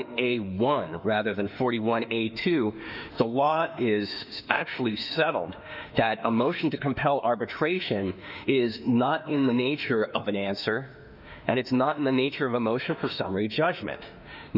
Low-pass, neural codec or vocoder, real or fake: 5.4 kHz; autoencoder, 48 kHz, 32 numbers a frame, DAC-VAE, trained on Japanese speech; fake